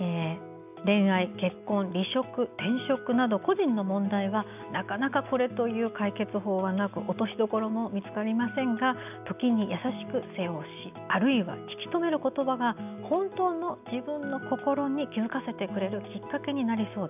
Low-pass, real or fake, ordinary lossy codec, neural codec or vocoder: 3.6 kHz; real; none; none